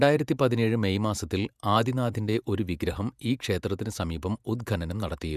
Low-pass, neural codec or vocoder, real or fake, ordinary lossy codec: 14.4 kHz; vocoder, 48 kHz, 128 mel bands, Vocos; fake; none